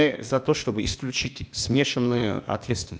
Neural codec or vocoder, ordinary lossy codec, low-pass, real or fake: codec, 16 kHz, 0.8 kbps, ZipCodec; none; none; fake